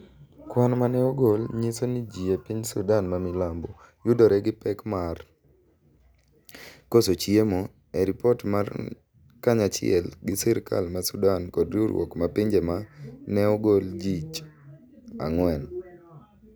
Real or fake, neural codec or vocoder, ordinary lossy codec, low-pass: real; none; none; none